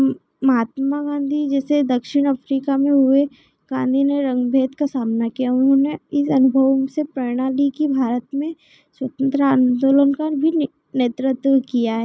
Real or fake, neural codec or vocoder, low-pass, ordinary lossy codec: real; none; none; none